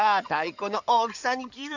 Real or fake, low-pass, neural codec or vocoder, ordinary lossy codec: fake; 7.2 kHz; codec, 16 kHz in and 24 kHz out, 2.2 kbps, FireRedTTS-2 codec; none